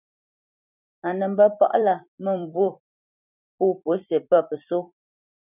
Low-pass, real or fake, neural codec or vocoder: 3.6 kHz; fake; vocoder, 44.1 kHz, 128 mel bands every 256 samples, BigVGAN v2